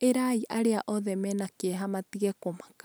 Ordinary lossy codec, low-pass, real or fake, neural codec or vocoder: none; none; real; none